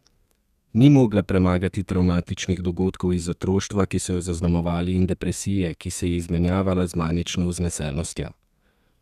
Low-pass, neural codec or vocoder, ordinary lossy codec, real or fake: 14.4 kHz; codec, 32 kHz, 1.9 kbps, SNAC; none; fake